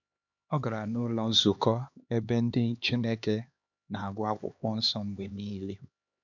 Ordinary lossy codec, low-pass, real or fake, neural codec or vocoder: none; 7.2 kHz; fake; codec, 16 kHz, 2 kbps, X-Codec, HuBERT features, trained on LibriSpeech